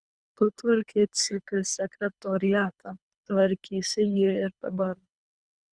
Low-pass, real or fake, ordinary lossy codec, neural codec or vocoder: 9.9 kHz; fake; Opus, 64 kbps; codec, 24 kHz, 3 kbps, HILCodec